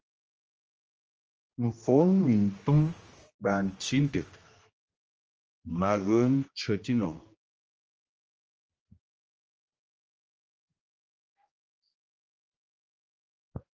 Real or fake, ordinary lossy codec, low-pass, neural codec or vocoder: fake; Opus, 24 kbps; 7.2 kHz; codec, 16 kHz, 1 kbps, X-Codec, HuBERT features, trained on general audio